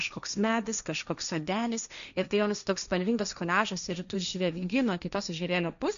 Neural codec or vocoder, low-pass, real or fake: codec, 16 kHz, 1.1 kbps, Voila-Tokenizer; 7.2 kHz; fake